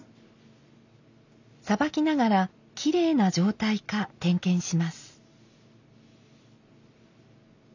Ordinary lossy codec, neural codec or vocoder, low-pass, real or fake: none; none; 7.2 kHz; real